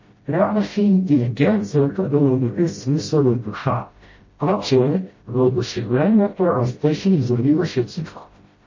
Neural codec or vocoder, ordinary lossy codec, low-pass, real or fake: codec, 16 kHz, 0.5 kbps, FreqCodec, smaller model; MP3, 32 kbps; 7.2 kHz; fake